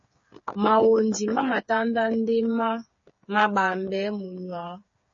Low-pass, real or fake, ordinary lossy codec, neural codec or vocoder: 7.2 kHz; fake; MP3, 32 kbps; codec, 16 kHz, 8 kbps, FreqCodec, smaller model